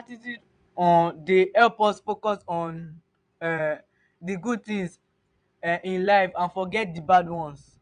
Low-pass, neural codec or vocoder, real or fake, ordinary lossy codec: 9.9 kHz; vocoder, 22.05 kHz, 80 mel bands, Vocos; fake; none